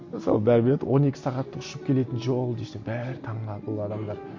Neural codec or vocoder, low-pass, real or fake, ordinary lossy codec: none; 7.2 kHz; real; MP3, 48 kbps